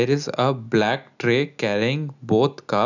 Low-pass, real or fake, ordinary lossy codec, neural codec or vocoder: 7.2 kHz; real; none; none